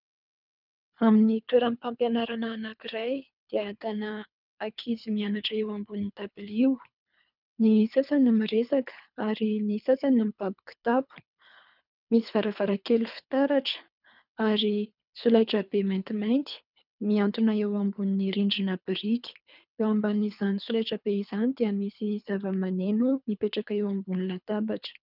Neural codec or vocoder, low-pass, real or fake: codec, 24 kHz, 3 kbps, HILCodec; 5.4 kHz; fake